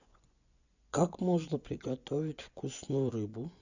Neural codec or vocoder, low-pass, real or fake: vocoder, 24 kHz, 100 mel bands, Vocos; 7.2 kHz; fake